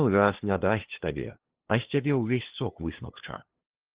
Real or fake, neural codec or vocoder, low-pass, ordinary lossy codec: fake; codec, 16 kHz, 2 kbps, FunCodec, trained on LibriTTS, 25 frames a second; 3.6 kHz; Opus, 16 kbps